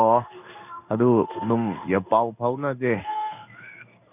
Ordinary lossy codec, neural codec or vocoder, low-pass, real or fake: none; codec, 16 kHz in and 24 kHz out, 1 kbps, XY-Tokenizer; 3.6 kHz; fake